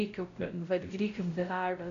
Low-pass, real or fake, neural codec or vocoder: 7.2 kHz; fake; codec, 16 kHz, 0.5 kbps, X-Codec, WavLM features, trained on Multilingual LibriSpeech